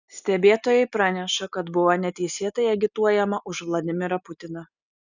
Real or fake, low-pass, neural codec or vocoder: real; 7.2 kHz; none